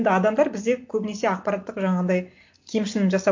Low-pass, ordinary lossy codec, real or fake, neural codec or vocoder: 7.2 kHz; MP3, 48 kbps; real; none